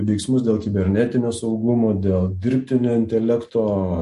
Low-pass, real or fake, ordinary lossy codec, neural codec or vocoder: 14.4 kHz; real; MP3, 48 kbps; none